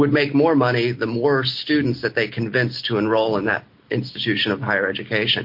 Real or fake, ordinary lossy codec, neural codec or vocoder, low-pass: real; MP3, 32 kbps; none; 5.4 kHz